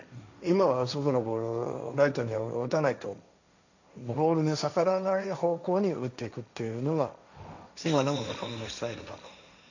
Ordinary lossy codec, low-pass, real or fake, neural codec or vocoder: none; 7.2 kHz; fake; codec, 16 kHz, 1.1 kbps, Voila-Tokenizer